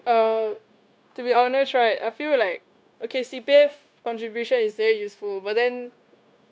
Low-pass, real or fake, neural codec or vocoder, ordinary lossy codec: none; fake; codec, 16 kHz, 0.9 kbps, LongCat-Audio-Codec; none